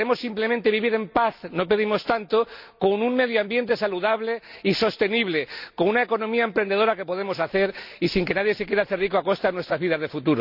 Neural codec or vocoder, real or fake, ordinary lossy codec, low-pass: none; real; none; 5.4 kHz